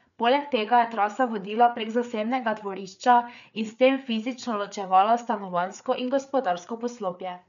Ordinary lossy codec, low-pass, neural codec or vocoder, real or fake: none; 7.2 kHz; codec, 16 kHz, 4 kbps, FreqCodec, larger model; fake